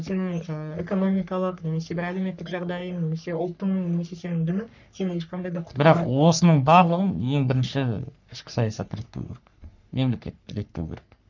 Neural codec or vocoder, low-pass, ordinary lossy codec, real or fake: codec, 44.1 kHz, 3.4 kbps, Pupu-Codec; 7.2 kHz; none; fake